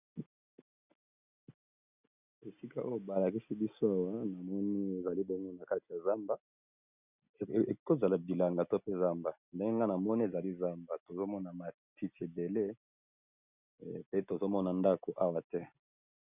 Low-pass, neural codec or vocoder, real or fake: 3.6 kHz; none; real